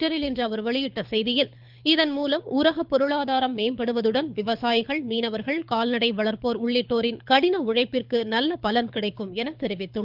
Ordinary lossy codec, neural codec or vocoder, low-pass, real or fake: Opus, 24 kbps; codec, 24 kHz, 6 kbps, HILCodec; 5.4 kHz; fake